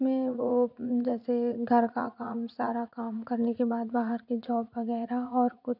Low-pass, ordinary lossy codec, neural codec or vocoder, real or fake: 5.4 kHz; none; vocoder, 44.1 kHz, 80 mel bands, Vocos; fake